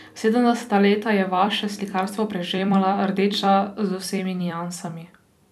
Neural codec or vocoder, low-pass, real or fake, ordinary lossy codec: vocoder, 44.1 kHz, 128 mel bands every 256 samples, BigVGAN v2; 14.4 kHz; fake; none